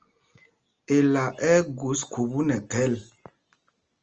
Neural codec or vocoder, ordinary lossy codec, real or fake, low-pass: none; Opus, 24 kbps; real; 7.2 kHz